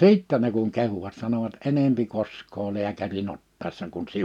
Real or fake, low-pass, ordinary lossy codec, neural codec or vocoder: real; 19.8 kHz; none; none